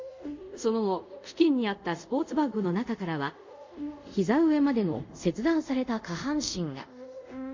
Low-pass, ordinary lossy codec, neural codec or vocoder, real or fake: 7.2 kHz; MP3, 64 kbps; codec, 24 kHz, 0.5 kbps, DualCodec; fake